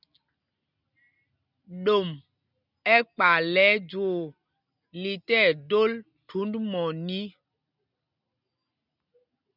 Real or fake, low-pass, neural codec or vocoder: real; 5.4 kHz; none